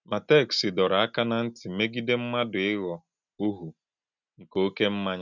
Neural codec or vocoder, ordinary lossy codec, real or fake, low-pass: none; none; real; 7.2 kHz